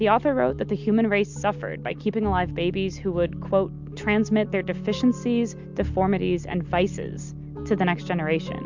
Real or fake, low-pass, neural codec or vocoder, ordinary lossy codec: real; 7.2 kHz; none; MP3, 64 kbps